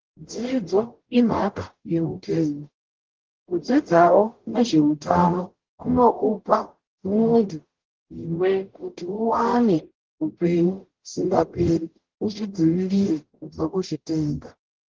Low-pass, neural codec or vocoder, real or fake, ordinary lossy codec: 7.2 kHz; codec, 44.1 kHz, 0.9 kbps, DAC; fake; Opus, 16 kbps